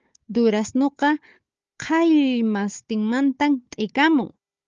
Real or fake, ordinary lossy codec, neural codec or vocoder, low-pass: fake; Opus, 32 kbps; codec, 16 kHz, 4 kbps, FunCodec, trained on Chinese and English, 50 frames a second; 7.2 kHz